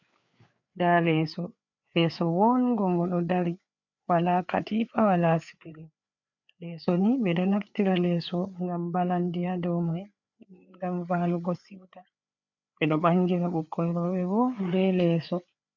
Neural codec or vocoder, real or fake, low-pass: codec, 16 kHz, 4 kbps, FreqCodec, larger model; fake; 7.2 kHz